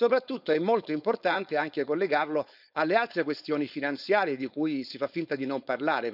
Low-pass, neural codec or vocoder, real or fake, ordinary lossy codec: 5.4 kHz; codec, 16 kHz, 4.8 kbps, FACodec; fake; none